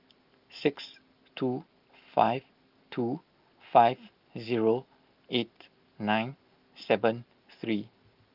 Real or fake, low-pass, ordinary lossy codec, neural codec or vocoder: real; 5.4 kHz; Opus, 32 kbps; none